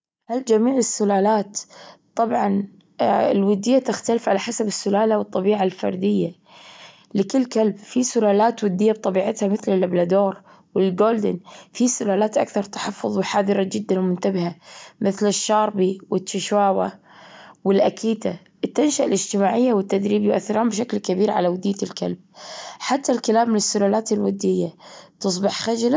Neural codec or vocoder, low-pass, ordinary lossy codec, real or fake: none; none; none; real